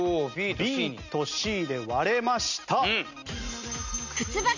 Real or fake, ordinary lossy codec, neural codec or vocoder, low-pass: real; none; none; 7.2 kHz